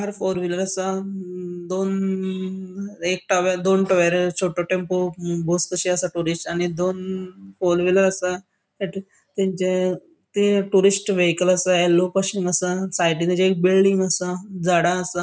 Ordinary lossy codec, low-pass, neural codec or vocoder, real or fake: none; none; none; real